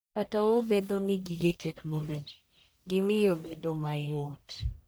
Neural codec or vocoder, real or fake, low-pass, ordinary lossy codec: codec, 44.1 kHz, 1.7 kbps, Pupu-Codec; fake; none; none